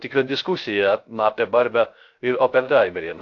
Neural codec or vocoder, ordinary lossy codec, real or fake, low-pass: codec, 16 kHz, 0.3 kbps, FocalCodec; AAC, 48 kbps; fake; 7.2 kHz